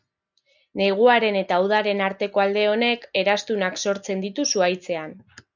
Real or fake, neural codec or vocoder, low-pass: real; none; 7.2 kHz